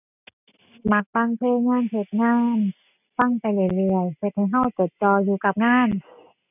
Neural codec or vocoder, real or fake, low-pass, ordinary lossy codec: none; real; 3.6 kHz; none